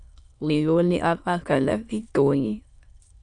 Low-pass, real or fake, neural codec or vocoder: 9.9 kHz; fake; autoencoder, 22.05 kHz, a latent of 192 numbers a frame, VITS, trained on many speakers